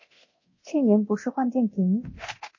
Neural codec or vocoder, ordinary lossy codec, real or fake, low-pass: codec, 24 kHz, 0.9 kbps, DualCodec; MP3, 32 kbps; fake; 7.2 kHz